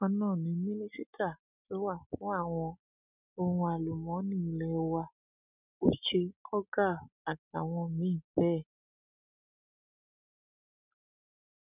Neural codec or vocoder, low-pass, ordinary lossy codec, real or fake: none; 3.6 kHz; none; real